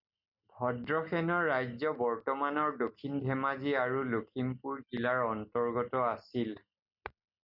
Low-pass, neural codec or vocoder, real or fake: 5.4 kHz; none; real